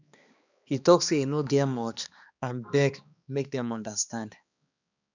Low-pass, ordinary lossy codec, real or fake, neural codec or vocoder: 7.2 kHz; none; fake; codec, 16 kHz, 2 kbps, X-Codec, HuBERT features, trained on balanced general audio